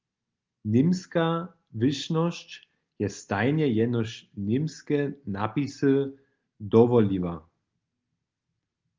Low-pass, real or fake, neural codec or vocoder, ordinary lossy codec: 7.2 kHz; real; none; Opus, 32 kbps